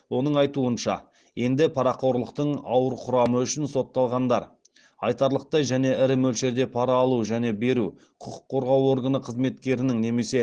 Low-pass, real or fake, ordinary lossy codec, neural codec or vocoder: 9.9 kHz; real; Opus, 16 kbps; none